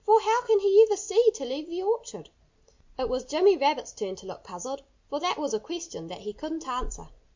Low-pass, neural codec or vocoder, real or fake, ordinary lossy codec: 7.2 kHz; none; real; MP3, 48 kbps